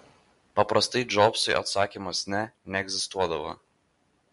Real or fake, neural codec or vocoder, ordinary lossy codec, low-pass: real; none; MP3, 64 kbps; 10.8 kHz